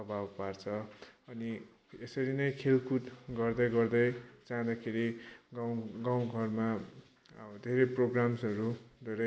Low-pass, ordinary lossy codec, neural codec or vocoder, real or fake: none; none; none; real